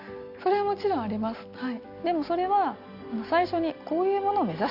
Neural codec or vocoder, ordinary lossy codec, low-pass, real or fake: none; none; 5.4 kHz; real